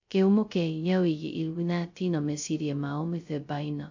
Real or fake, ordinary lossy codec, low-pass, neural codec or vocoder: fake; AAC, 48 kbps; 7.2 kHz; codec, 16 kHz, 0.2 kbps, FocalCodec